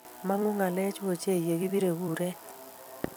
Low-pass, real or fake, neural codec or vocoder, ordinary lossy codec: none; fake; vocoder, 44.1 kHz, 128 mel bands every 256 samples, BigVGAN v2; none